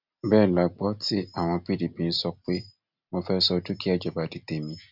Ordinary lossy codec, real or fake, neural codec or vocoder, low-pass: none; real; none; 5.4 kHz